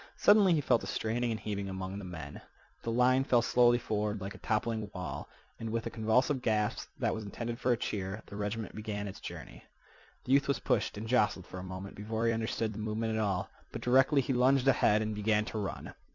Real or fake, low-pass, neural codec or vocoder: fake; 7.2 kHz; vocoder, 44.1 kHz, 128 mel bands every 256 samples, BigVGAN v2